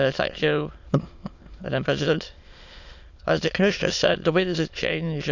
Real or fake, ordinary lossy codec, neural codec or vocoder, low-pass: fake; none; autoencoder, 22.05 kHz, a latent of 192 numbers a frame, VITS, trained on many speakers; 7.2 kHz